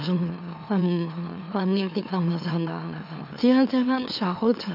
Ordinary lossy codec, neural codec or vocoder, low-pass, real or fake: none; autoencoder, 44.1 kHz, a latent of 192 numbers a frame, MeloTTS; 5.4 kHz; fake